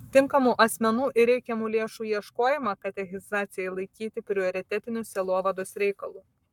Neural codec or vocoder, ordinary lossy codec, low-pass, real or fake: codec, 44.1 kHz, 7.8 kbps, Pupu-Codec; MP3, 96 kbps; 19.8 kHz; fake